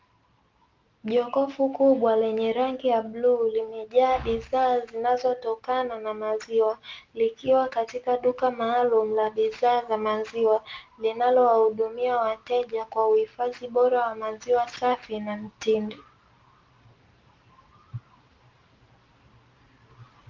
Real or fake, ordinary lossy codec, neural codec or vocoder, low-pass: real; Opus, 32 kbps; none; 7.2 kHz